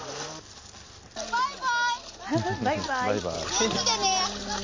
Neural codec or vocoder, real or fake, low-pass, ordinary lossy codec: none; real; 7.2 kHz; MP3, 32 kbps